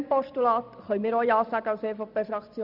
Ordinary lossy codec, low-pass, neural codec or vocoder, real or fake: none; 5.4 kHz; none; real